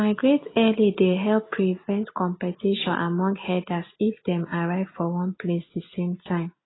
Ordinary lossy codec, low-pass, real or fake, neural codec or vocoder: AAC, 16 kbps; 7.2 kHz; real; none